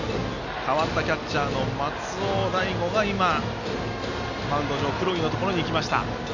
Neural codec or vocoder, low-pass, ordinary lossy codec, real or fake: none; 7.2 kHz; none; real